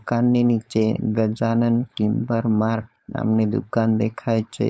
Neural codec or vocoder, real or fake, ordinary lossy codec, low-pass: codec, 16 kHz, 4.8 kbps, FACodec; fake; none; none